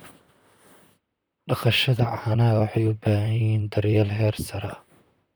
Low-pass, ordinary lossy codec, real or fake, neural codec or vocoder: none; none; fake; vocoder, 44.1 kHz, 128 mel bands, Pupu-Vocoder